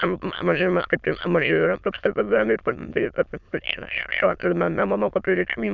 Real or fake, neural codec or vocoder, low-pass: fake; autoencoder, 22.05 kHz, a latent of 192 numbers a frame, VITS, trained on many speakers; 7.2 kHz